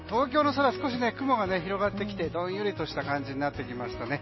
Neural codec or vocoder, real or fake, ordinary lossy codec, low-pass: none; real; MP3, 24 kbps; 7.2 kHz